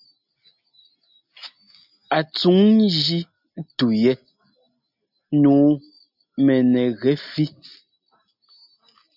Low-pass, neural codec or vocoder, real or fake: 5.4 kHz; none; real